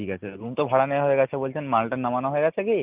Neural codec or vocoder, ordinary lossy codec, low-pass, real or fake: none; Opus, 32 kbps; 3.6 kHz; real